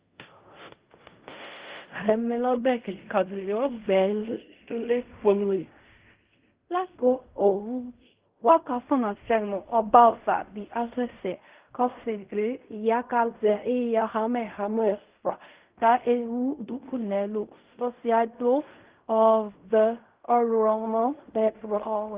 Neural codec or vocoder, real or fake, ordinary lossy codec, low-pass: codec, 16 kHz in and 24 kHz out, 0.4 kbps, LongCat-Audio-Codec, fine tuned four codebook decoder; fake; Opus, 32 kbps; 3.6 kHz